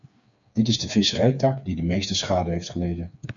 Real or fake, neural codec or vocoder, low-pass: fake; codec, 16 kHz, 4 kbps, FreqCodec, smaller model; 7.2 kHz